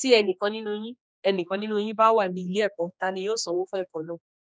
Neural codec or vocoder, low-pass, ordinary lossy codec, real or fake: codec, 16 kHz, 2 kbps, X-Codec, HuBERT features, trained on general audio; none; none; fake